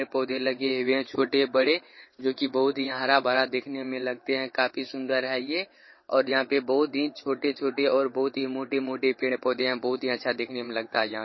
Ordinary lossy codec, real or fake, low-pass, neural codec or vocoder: MP3, 24 kbps; fake; 7.2 kHz; vocoder, 22.05 kHz, 80 mel bands, WaveNeXt